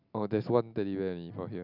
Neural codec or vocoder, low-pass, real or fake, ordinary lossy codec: none; 5.4 kHz; real; none